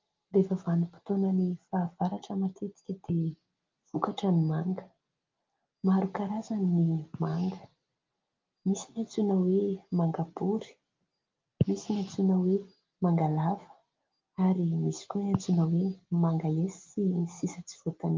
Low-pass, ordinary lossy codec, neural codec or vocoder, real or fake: 7.2 kHz; Opus, 32 kbps; none; real